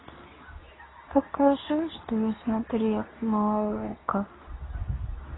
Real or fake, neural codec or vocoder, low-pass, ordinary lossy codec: fake; codec, 24 kHz, 0.9 kbps, WavTokenizer, medium speech release version 2; 7.2 kHz; AAC, 16 kbps